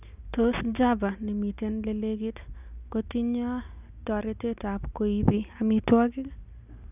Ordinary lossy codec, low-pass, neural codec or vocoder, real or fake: none; 3.6 kHz; none; real